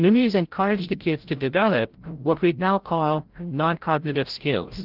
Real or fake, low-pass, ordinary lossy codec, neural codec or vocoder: fake; 5.4 kHz; Opus, 16 kbps; codec, 16 kHz, 0.5 kbps, FreqCodec, larger model